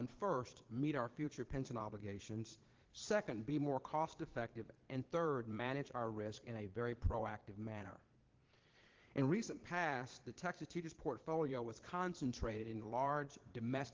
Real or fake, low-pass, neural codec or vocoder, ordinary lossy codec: fake; 7.2 kHz; vocoder, 22.05 kHz, 80 mel bands, Vocos; Opus, 16 kbps